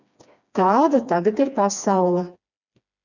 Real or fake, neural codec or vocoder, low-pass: fake; codec, 16 kHz, 2 kbps, FreqCodec, smaller model; 7.2 kHz